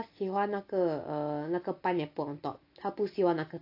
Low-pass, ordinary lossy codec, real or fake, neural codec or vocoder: 5.4 kHz; AAC, 32 kbps; real; none